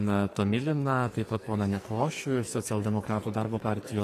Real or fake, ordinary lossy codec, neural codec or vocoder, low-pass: fake; AAC, 48 kbps; codec, 44.1 kHz, 2.6 kbps, SNAC; 14.4 kHz